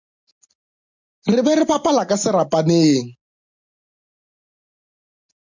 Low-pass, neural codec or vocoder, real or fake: 7.2 kHz; none; real